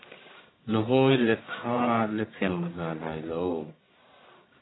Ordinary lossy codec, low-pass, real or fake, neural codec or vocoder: AAC, 16 kbps; 7.2 kHz; fake; codec, 44.1 kHz, 1.7 kbps, Pupu-Codec